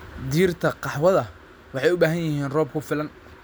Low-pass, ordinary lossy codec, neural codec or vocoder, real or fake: none; none; none; real